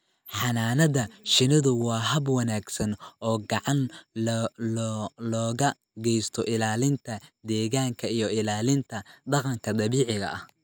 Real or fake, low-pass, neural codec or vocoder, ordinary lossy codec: real; none; none; none